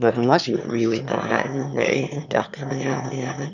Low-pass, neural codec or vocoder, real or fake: 7.2 kHz; autoencoder, 22.05 kHz, a latent of 192 numbers a frame, VITS, trained on one speaker; fake